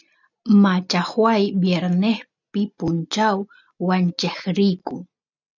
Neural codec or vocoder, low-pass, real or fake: none; 7.2 kHz; real